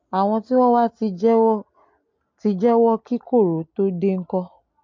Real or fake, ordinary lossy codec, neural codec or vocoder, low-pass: real; MP3, 48 kbps; none; 7.2 kHz